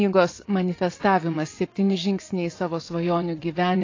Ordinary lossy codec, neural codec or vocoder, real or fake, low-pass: AAC, 32 kbps; vocoder, 24 kHz, 100 mel bands, Vocos; fake; 7.2 kHz